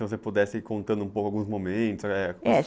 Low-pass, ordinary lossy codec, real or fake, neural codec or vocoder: none; none; real; none